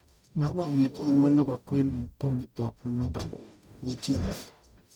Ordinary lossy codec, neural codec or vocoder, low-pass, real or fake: none; codec, 44.1 kHz, 0.9 kbps, DAC; 19.8 kHz; fake